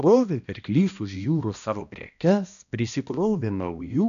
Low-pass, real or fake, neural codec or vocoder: 7.2 kHz; fake; codec, 16 kHz, 1 kbps, X-Codec, HuBERT features, trained on balanced general audio